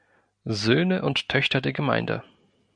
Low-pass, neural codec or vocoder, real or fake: 9.9 kHz; none; real